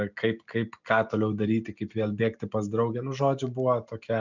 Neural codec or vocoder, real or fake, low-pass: none; real; 7.2 kHz